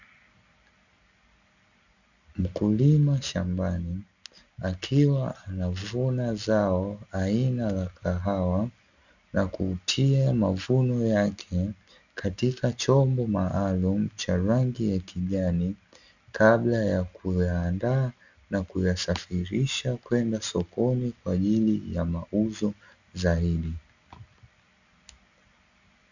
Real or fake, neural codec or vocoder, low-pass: real; none; 7.2 kHz